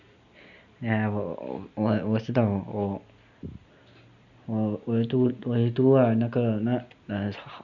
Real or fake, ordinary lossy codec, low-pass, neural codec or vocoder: real; none; 7.2 kHz; none